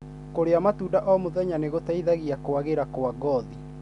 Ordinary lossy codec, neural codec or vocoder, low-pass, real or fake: none; none; 10.8 kHz; real